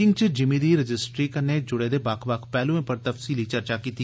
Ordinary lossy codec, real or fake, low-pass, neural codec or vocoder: none; real; none; none